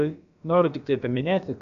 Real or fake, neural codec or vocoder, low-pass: fake; codec, 16 kHz, about 1 kbps, DyCAST, with the encoder's durations; 7.2 kHz